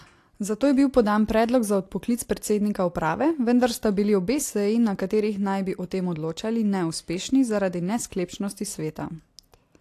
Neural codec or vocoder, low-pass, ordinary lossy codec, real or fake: none; 14.4 kHz; AAC, 64 kbps; real